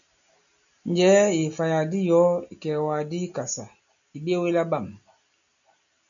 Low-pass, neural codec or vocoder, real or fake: 7.2 kHz; none; real